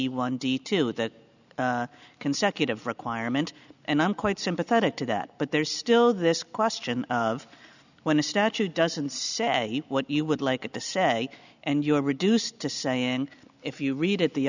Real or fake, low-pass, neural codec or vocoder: real; 7.2 kHz; none